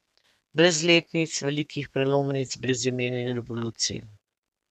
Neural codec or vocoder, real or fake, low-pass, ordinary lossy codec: codec, 32 kHz, 1.9 kbps, SNAC; fake; 14.4 kHz; none